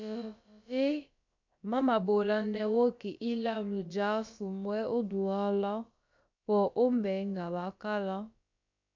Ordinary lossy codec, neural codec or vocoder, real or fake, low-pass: MP3, 64 kbps; codec, 16 kHz, about 1 kbps, DyCAST, with the encoder's durations; fake; 7.2 kHz